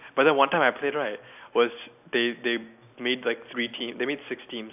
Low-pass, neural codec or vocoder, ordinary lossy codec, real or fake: 3.6 kHz; none; none; real